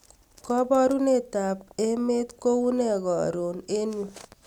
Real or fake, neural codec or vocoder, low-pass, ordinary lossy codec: real; none; 19.8 kHz; none